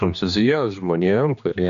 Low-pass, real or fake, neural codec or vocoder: 7.2 kHz; fake; codec, 16 kHz, 2 kbps, X-Codec, HuBERT features, trained on general audio